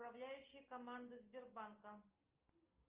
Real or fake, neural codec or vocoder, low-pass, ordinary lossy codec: real; none; 3.6 kHz; Opus, 24 kbps